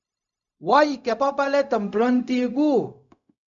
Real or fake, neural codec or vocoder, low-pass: fake; codec, 16 kHz, 0.4 kbps, LongCat-Audio-Codec; 7.2 kHz